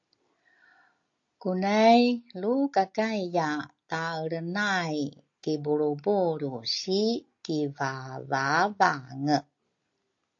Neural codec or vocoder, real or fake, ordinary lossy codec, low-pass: none; real; AAC, 48 kbps; 7.2 kHz